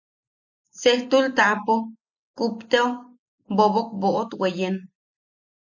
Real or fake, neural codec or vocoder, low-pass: real; none; 7.2 kHz